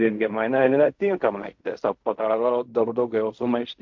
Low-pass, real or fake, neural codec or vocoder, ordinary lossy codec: 7.2 kHz; fake; codec, 16 kHz in and 24 kHz out, 0.4 kbps, LongCat-Audio-Codec, fine tuned four codebook decoder; MP3, 48 kbps